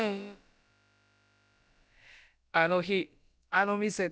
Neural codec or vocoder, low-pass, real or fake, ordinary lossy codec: codec, 16 kHz, about 1 kbps, DyCAST, with the encoder's durations; none; fake; none